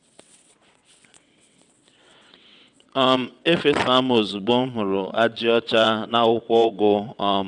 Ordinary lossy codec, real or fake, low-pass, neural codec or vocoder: none; fake; 9.9 kHz; vocoder, 22.05 kHz, 80 mel bands, WaveNeXt